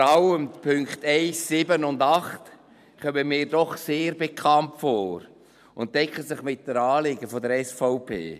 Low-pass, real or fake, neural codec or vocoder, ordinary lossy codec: 14.4 kHz; fake; vocoder, 44.1 kHz, 128 mel bands every 512 samples, BigVGAN v2; none